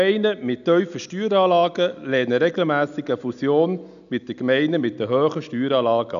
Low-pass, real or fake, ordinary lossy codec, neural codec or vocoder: 7.2 kHz; real; none; none